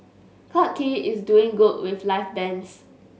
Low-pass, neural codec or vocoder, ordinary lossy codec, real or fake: none; none; none; real